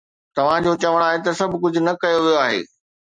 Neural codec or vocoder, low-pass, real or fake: none; 9.9 kHz; real